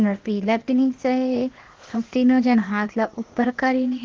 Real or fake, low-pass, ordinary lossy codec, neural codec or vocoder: fake; 7.2 kHz; Opus, 16 kbps; codec, 16 kHz, 0.8 kbps, ZipCodec